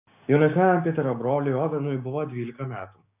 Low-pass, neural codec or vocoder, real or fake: 3.6 kHz; codec, 16 kHz, 6 kbps, DAC; fake